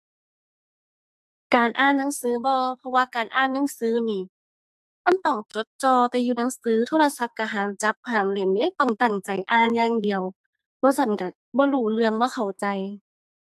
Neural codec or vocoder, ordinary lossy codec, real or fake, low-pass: codec, 44.1 kHz, 2.6 kbps, SNAC; AAC, 96 kbps; fake; 14.4 kHz